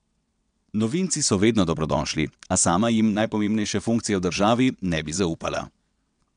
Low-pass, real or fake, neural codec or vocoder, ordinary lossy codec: 9.9 kHz; fake; vocoder, 22.05 kHz, 80 mel bands, WaveNeXt; none